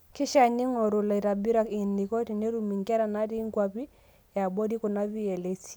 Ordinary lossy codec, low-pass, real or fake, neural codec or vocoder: none; none; real; none